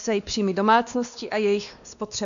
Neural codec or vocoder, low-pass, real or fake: codec, 16 kHz, 2 kbps, X-Codec, WavLM features, trained on Multilingual LibriSpeech; 7.2 kHz; fake